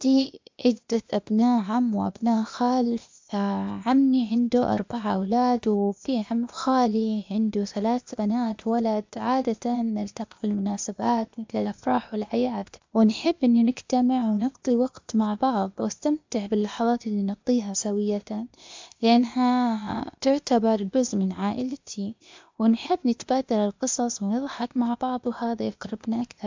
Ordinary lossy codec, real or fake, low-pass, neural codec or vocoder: MP3, 64 kbps; fake; 7.2 kHz; codec, 16 kHz, 0.8 kbps, ZipCodec